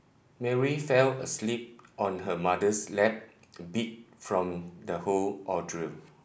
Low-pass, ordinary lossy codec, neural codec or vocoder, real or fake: none; none; none; real